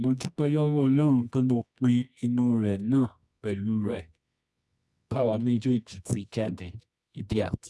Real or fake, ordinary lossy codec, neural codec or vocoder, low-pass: fake; none; codec, 24 kHz, 0.9 kbps, WavTokenizer, medium music audio release; none